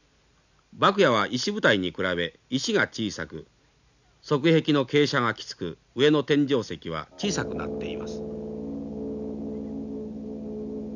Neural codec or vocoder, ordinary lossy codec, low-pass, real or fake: none; none; 7.2 kHz; real